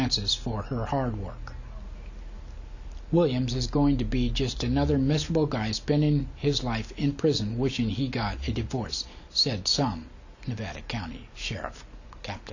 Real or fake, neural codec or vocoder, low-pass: real; none; 7.2 kHz